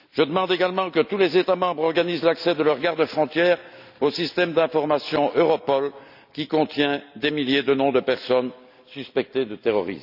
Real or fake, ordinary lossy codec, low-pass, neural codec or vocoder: real; none; 5.4 kHz; none